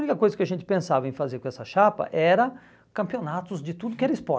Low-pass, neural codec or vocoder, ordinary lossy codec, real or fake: none; none; none; real